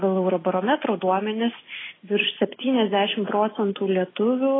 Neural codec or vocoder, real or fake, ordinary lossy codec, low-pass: none; real; AAC, 16 kbps; 7.2 kHz